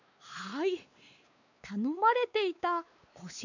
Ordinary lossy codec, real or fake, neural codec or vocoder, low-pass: none; fake; codec, 16 kHz, 4 kbps, X-Codec, WavLM features, trained on Multilingual LibriSpeech; 7.2 kHz